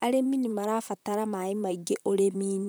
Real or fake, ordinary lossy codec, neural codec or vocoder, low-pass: fake; none; vocoder, 44.1 kHz, 128 mel bands, Pupu-Vocoder; none